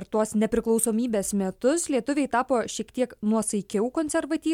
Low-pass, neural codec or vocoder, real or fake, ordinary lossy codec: 19.8 kHz; none; real; MP3, 96 kbps